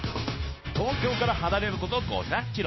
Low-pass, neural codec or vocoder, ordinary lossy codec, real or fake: 7.2 kHz; codec, 16 kHz, 0.9 kbps, LongCat-Audio-Codec; MP3, 24 kbps; fake